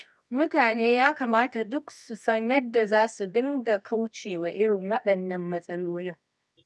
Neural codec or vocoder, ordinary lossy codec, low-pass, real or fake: codec, 24 kHz, 0.9 kbps, WavTokenizer, medium music audio release; none; none; fake